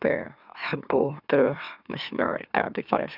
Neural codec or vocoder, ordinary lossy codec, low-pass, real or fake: autoencoder, 44.1 kHz, a latent of 192 numbers a frame, MeloTTS; none; 5.4 kHz; fake